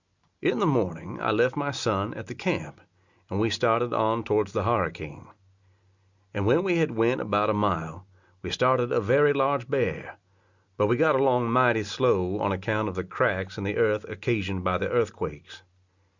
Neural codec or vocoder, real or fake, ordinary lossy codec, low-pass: none; real; Opus, 64 kbps; 7.2 kHz